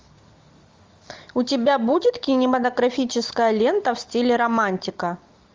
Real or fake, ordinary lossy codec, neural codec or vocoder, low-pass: real; Opus, 32 kbps; none; 7.2 kHz